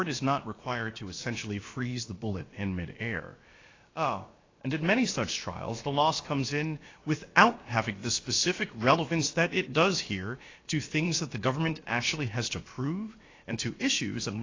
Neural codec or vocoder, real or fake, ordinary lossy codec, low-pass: codec, 16 kHz, about 1 kbps, DyCAST, with the encoder's durations; fake; AAC, 32 kbps; 7.2 kHz